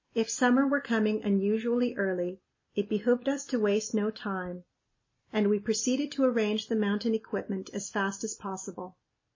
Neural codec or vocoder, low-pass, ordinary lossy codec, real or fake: none; 7.2 kHz; MP3, 32 kbps; real